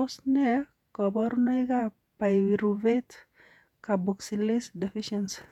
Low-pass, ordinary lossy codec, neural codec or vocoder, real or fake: 19.8 kHz; none; vocoder, 48 kHz, 128 mel bands, Vocos; fake